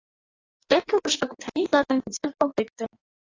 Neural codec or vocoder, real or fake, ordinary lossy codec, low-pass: codec, 16 kHz in and 24 kHz out, 1.1 kbps, FireRedTTS-2 codec; fake; AAC, 32 kbps; 7.2 kHz